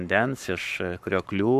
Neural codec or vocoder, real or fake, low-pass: codec, 44.1 kHz, 7.8 kbps, Pupu-Codec; fake; 14.4 kHz